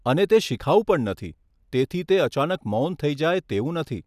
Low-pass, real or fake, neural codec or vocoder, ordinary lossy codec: 14.4 kHz; real; none; none